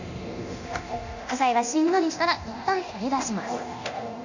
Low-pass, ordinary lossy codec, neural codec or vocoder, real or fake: 7.2 kHz; none; codec, 24 kHz, 0.9 kbps, DualCodec; fake